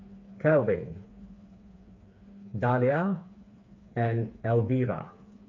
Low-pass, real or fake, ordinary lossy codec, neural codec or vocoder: 7.2 kHz; fake; AAC, 48 kbps; codec, 16 kHz, 4 kbps, FreqCodec, smaller model